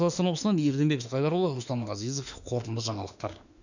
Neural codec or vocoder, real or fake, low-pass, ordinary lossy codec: autoencoder, 48 kHz, 32 numbers a frame, DAC-VAE, trained on Japanese speech; fake; 7.2 kHz; none